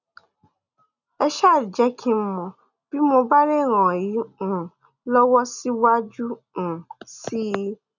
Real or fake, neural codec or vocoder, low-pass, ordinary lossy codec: real; none; 7.2 kHz; none